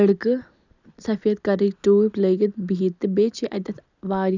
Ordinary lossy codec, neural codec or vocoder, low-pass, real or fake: none; none; 7.2 kHz; real